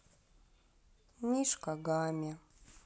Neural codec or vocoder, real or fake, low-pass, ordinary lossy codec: none; real; none; none